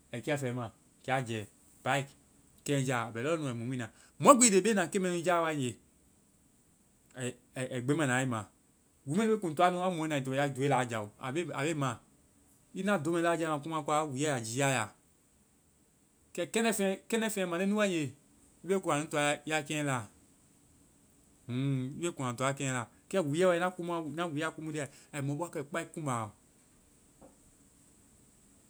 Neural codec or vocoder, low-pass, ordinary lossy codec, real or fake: none; none; none; real